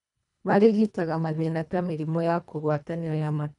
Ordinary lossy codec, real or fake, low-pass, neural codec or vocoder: none; fake; 10.8 kHz; codec, 24 kHz, 1.5 kbps, HILCodec